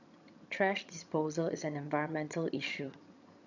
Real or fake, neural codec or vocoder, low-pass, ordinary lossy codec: fake; vocoder, 22.05 kHz, 80 mel bands, HiFi-GAN; 7.2 kHz; none